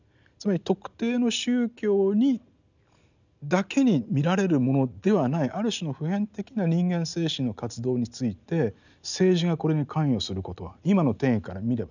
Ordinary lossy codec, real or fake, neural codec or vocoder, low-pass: none; real; none; 7.2 kHz